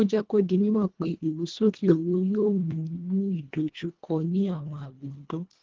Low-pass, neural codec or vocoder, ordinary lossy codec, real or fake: 7.2 kHz; codec, 24 kHz, 1.5 kbps, HILCodec; Opus, 16 kbps; fake